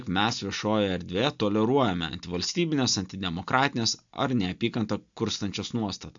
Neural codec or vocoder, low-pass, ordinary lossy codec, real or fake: none; 7.2 kHz; AAC, 64 kbps; real